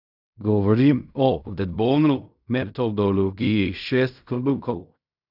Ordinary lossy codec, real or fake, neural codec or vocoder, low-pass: none; fake; codec, 16 kHz in and 24 kHz out, 0.4 kbps, LongCat-Audio-Codec, fine tuned four codebook decoder; 5.4 kHz